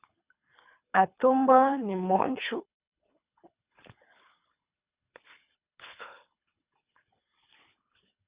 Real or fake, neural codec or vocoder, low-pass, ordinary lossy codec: fake; codec, 24 kHz, 3 kbps, HILCodec; 3.6 kHz; Opus, 64 kbps